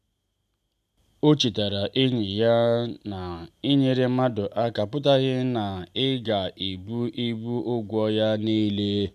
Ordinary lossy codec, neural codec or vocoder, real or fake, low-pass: none; none; real; 14.4 kHz